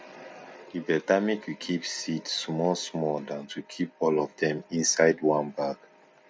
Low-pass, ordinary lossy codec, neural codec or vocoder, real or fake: none; none; none; real